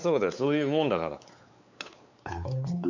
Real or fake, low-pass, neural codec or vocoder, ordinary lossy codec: fake; 7.2 kHz; codec, 16 kHz, 4 kbps, X-Codec, WavLM features, trained on Multilingual LibriSpeech; none